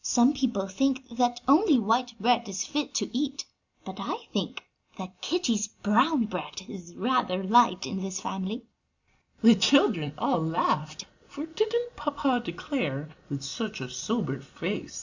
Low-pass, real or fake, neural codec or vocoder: 7.2 kHz; real; none